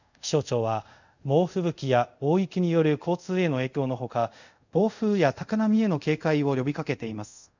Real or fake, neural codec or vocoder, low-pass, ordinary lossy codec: fake; codec, 24 kHz, 0.5 kbps, DualCodec; 7.2 kHz; none